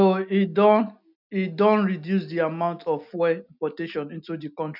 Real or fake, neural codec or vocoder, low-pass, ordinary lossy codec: real; none; 5.4 kHz; none